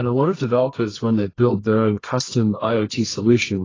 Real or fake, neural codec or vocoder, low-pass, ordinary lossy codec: fake; codec, 24 kHz, 0.9 kbps, WavTokenizer, medium music audio release; 7.2 kHz; AAC, 32 kbps